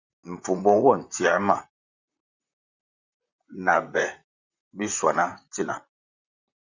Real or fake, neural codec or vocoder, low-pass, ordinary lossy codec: fake; vocoder, 44.1 kHz, 128 mel bands, Pupu-Vocoder; 7.2 kHz; Opus, 64 kbps